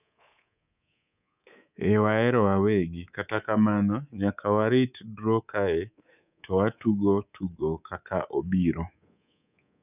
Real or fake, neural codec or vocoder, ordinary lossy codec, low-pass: fake; codec, 24 kHz, 3.1 kbps, DualCodec; none; 3.6 kHz